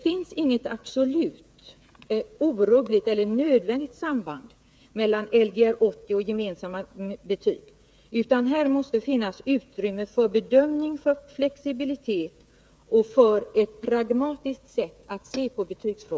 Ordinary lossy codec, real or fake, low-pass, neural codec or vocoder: none; fake; none; codec, 16 kHz, 8 kbps, FreqCodec, smaller model